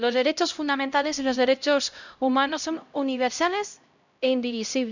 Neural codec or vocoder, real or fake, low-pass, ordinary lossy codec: codec, 16 kHz, 0.5 kbps, X-Codec, HuBERT features, trained on LibriSpeech; fake; 7.2 kHz; none